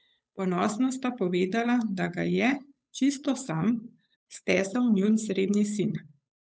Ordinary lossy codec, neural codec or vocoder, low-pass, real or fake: none; codec, 16 kHz, 8 kbps, FunCodec, trained on Chinese and English, 25 frames a second; none; fake